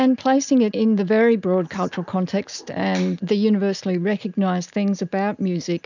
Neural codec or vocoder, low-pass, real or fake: none; 7.2 kHz; real